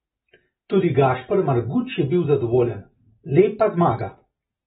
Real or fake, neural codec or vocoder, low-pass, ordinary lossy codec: real; none; 19.8 kHz; AAC, 16 kbps